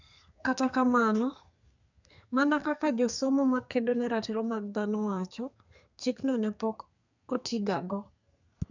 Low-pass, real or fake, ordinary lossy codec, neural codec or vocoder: 7.2 kHz; fake; none; codec, 32 kHz, 1.9 kbps, SNAC